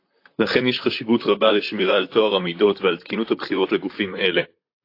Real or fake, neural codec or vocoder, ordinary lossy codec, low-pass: fake; vocoder, 44.1 kHz, 128 mel bands, Pupu-Vocoder; AAC, 32 kbps; 5.4 kHz